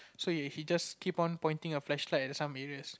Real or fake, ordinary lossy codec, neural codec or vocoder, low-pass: real; none; none; none